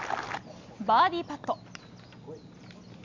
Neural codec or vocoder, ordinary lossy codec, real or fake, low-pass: none; MP3, 64 kbps; real; 7.2 kHz